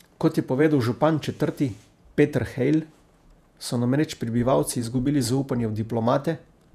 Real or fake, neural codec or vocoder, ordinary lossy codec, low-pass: fake; vocoder, 48 kHz, 128 mel bands, Vocos; none; 14.4 kHz